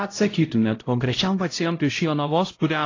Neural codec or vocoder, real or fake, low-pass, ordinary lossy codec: codec, 16 kHz, 0.5 kbps, X-Codec, HuBERT features, trained on LibriSpeech; fake; 7.2 kHz; AAC, 32 kbps